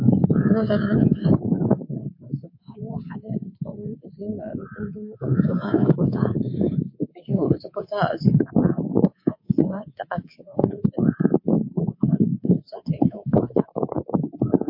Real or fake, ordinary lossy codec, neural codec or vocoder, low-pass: fake; MP3, 32 kbps; codec, 24 kHz, 3.1 kbps, DualCodec; 5.4 kHz